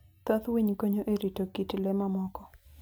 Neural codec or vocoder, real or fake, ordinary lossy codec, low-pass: none; real; none; none